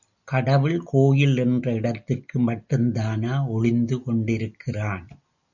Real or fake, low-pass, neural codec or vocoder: real; 7.2 kHz; none